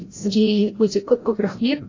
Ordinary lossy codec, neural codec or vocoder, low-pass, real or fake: AAC, 32 kbps; codec, 16 kHz, 0.5 kbps, FreqCodec, larger model; 7.2 kHz; fake